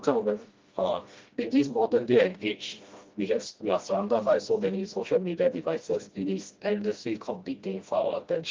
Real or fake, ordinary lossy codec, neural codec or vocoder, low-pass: fake; Opus, 32 kbps; codec, 16 kHz, 1 kbps, FreqCodec, smaller model; 7.2 kHz